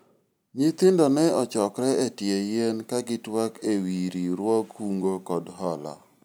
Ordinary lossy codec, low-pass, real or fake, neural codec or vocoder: none; none; real; none